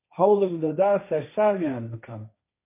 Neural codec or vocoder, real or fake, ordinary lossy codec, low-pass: codec, 16 kHz, 1.1 kbps, Voila-Tokenizer; fake; MP3, 24 kbps; 3.6 kHz